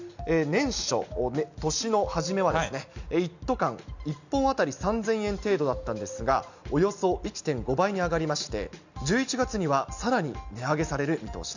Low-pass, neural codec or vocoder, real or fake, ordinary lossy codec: 7.2 kHz; none; real; none